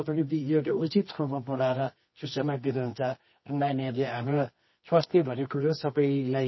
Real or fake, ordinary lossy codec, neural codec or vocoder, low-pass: fake; MP3, 24 kbps; codec, 24 kHz, 0.9 kbps, WavTokenizer, medium music audio release; 7.2 kHz